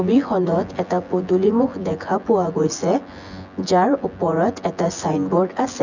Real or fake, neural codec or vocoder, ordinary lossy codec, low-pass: fake; vocoder, 24 kHz, 100 mel bands, Vocos; none; 7.2 kHz